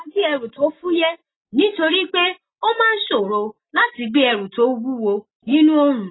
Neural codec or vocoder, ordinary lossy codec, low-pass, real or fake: none; AAC, 16 kbps; 7.2 kHz; real